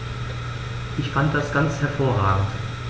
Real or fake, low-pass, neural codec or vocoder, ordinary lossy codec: real; none; none; none